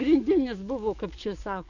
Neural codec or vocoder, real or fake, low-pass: codec, 44.1 kHz, 7.8 kbps, DAC; fake; 7.2 kHz